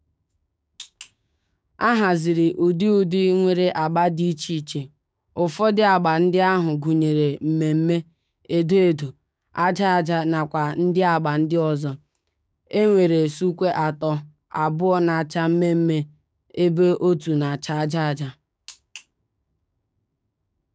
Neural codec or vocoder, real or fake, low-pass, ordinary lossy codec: codec, 16 kHz, 6 kbps, DAC; fake; none; none